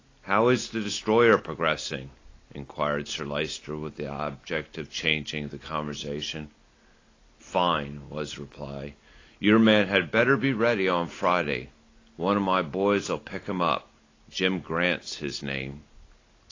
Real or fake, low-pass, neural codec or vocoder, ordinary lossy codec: real; 7.2 kHz; none; AAC, 32 kbps